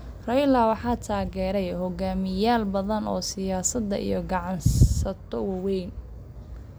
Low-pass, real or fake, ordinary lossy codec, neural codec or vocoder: none; real; none; none